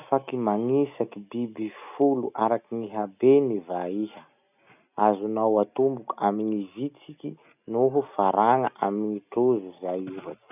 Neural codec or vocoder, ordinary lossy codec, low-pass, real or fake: none; none; 3.6 kHz; real